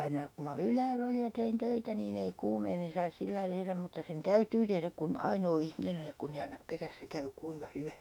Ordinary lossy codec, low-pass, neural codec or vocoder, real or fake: none; 19.8 kHz; autoencoder, 48 kHz, 32 numbers a frame, DAC-VAE, trained on Japanese speech; fake